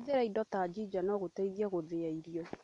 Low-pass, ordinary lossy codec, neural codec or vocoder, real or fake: 10.8 kHz; MP3, 64 kbps; none; real